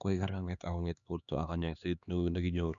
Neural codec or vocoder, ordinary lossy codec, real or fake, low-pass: codec, 16 kHz, 2 kbps, X-Codec, HuBERT features, trained on LibriSpeech; none; fake; 7.2 kHz